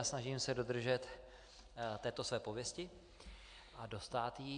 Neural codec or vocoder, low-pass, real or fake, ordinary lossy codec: none; 9.9 kHz; real; AAC, 64 kbps